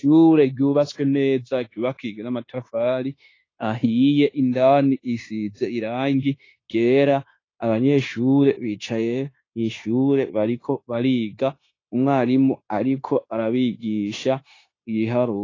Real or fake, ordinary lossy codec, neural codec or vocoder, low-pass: fake; AAC, 32 kbps; codec, 16 kHz, 0.9 kbps, LongCat-Audio-Codec; 7.2 kHz